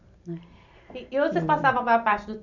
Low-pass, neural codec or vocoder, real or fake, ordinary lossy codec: 7.2 kHz; none; real; none